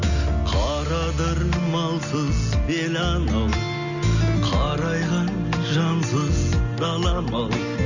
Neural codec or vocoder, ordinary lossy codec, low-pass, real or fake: none; none; 7.2 kHz; real